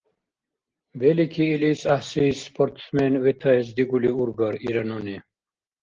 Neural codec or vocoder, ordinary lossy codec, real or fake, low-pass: none; Opus, 16 kbps; real; 7.2 kHz